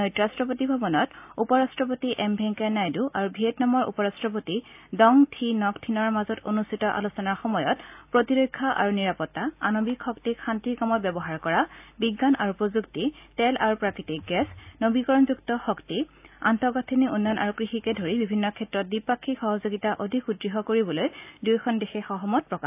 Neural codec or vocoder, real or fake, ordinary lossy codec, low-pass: none; real; AAC, 32 kbps; 3.6 kHz